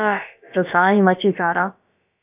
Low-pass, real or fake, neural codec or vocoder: 3.6 kHz; fake; codec, 16 kHz, about 1 kbps, DyCAST, with the encoder's durations